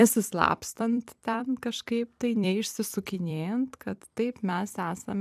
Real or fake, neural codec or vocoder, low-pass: fake; vocoder, 44.1 kHz, 128 mel bands every 256 samples, BigVGAN v2; 14.4 kHz